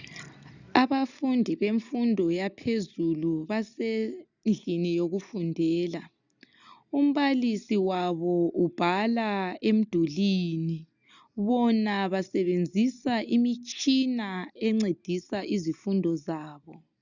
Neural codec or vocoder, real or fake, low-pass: none; real; 7.2 kHz